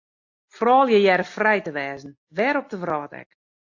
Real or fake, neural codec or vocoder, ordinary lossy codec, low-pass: real; none; AAC, 48 kbps; 7.2 kHz